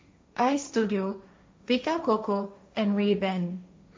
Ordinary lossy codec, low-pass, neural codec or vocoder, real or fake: none; none; codec, 16 kHz, 1.1 kbps, Voila-Tokenizer; fake